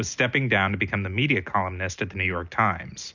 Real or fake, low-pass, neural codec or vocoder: real; 7.2 kHz; none